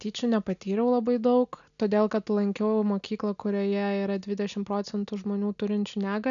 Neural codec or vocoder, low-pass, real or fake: none; 7.2 kHz; real